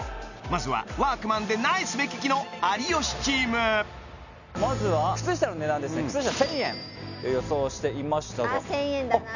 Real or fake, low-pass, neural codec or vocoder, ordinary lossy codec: real; 7.2 kHz; none; none